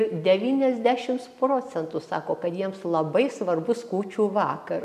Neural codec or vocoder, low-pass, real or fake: none; 14.4 kHz; real